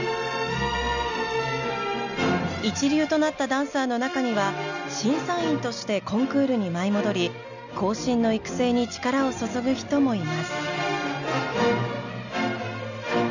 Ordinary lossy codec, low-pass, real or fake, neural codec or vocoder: none; 7.2 kHz; real; none